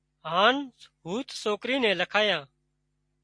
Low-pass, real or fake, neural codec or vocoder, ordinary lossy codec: 9.9 kHz; real; none; MP3, 48 kbps